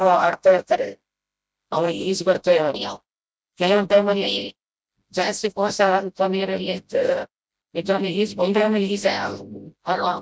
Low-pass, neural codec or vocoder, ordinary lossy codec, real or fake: none; codec, 16 kHz, 0.5 kbps, FreqCodec, smaller model; none; fake